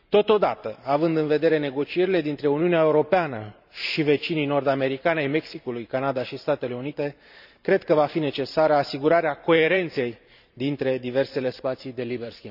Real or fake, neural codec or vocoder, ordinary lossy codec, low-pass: fake; vocoder, 44.1 kHz, 128 mel bands every 512 samples, BigVGAN v2; none; 5.4 kHz